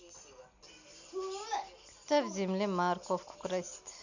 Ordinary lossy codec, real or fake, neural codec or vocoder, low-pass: none; real; none; 7.2 kHz